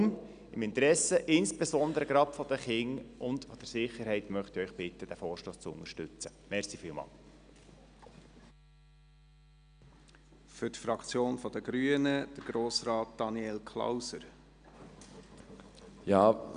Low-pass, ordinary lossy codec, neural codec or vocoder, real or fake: 9.9 kHz; none; none; real